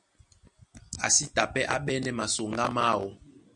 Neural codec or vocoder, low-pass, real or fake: none; 10.8 kHz; real